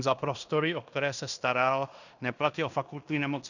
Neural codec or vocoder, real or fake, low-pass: codec, 16 kHz in and 24 kHz out, 0.9 kbps, LongCat-Audio-Codec, fine tuned four codebook decoder; fake; 7.2 kHz